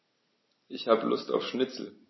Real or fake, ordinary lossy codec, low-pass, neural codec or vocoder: real; MP3, 24 kbps; 7.2 kHz; none